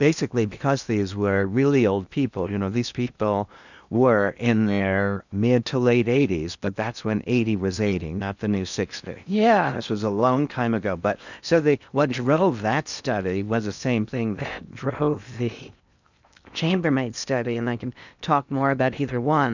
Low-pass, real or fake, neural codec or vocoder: 7.2 kHz; fake; codec, 16 kHz in and 24 kHz out, 0.8 kbps, FocalCodec, streaming, 65536 codes